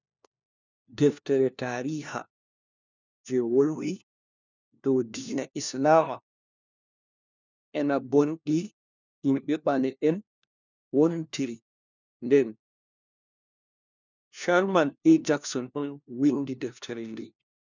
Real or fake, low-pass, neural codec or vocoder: fake; 7.2 kHz; codec, 16 kHz, 1 kbps, FunCodec, trained on LibriTTS, 50 frames a second